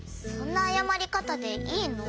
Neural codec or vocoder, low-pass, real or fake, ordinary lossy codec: none; none; real; none